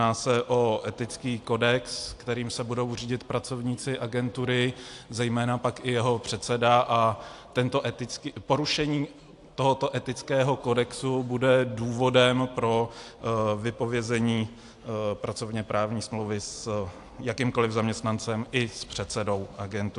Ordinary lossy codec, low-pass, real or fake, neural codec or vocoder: AAC, 64 kbps; 10.8 kHz; real; none